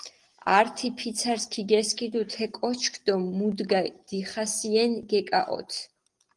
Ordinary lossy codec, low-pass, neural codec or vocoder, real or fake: Opus, 24 kbps; 10.8 kHz; none; real